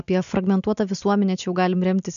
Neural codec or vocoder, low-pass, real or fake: none; 7.2 kHz; real